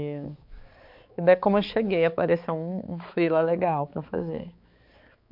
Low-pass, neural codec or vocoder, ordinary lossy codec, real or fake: 5.4 kHz; codec, 16 kHz, 4 kbps, X-Codec, HuBERT features, trained on balanced general audio; MP3, 48 kbps; fake